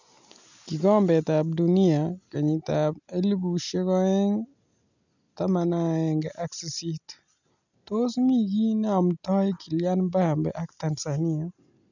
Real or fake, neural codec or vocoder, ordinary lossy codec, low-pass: real; none; none; 7.2 kHz